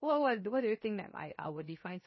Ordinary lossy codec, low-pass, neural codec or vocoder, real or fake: MP3, 24 kbps; 7.2 kHz; codec, 16 kHz, 0.7 kbps, FocalCodec; fake